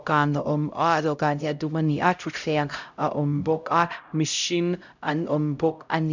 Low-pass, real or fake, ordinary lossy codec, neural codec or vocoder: 7.2 kHz; fake; none; codec, 16 kHz, 0.5 kbps, X-Codec, HuBERT features, trained on LibriSpeech